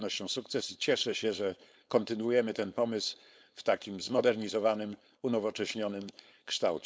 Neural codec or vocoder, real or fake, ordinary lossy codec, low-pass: codec, 16 kHz, 4.8 kbps, FACodec; fake; none; none